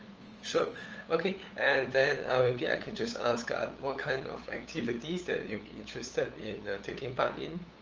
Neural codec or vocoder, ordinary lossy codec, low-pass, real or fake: codec, 16 kHz, 8 kbps, FunCodec, trained on LibriTTS, 25 frames a second; Opus, 24 kbps; 7.2 kHz; fake